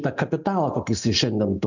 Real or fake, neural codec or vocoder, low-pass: real; none; 7.2 kHz